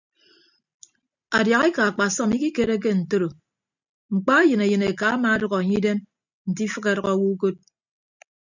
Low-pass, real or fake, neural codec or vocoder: 7.2 kHz; real; none